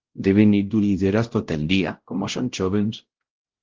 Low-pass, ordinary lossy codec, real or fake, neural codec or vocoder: 7.2 kHz; Opus, 16 kbps; fake; codec, 16 kHz, 0.5 kbps, X-Codec, WavLM features, trained on Multilingual LibriSpeech